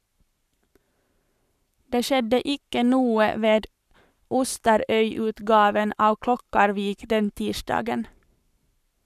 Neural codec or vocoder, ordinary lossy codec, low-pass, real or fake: codec, 44.1 kHz, 7.8 kbps, Pupu-Codec; none; 14.4 kHz; fake